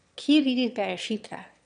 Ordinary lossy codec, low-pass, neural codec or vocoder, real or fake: none; 9.9 kHz; autoencoder, 22.05 kHz, a latent of 192 numbers a frame, VITS, trained on one speaker; fake